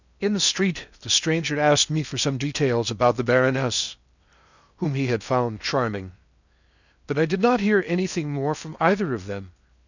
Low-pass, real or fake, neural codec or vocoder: 7.2 kHz; fake; codec, 16 kHz in and 24 kHz out, 0.8 kbps, FocalCodec, streaming, 65536 codes